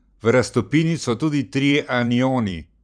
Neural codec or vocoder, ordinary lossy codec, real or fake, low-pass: vocoder, 44.1 kHz, 128 mel bands every 512 samples, BigVGAN v2; none; fake; 9.9 kHz